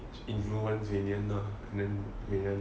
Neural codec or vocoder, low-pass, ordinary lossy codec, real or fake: none; none; none; real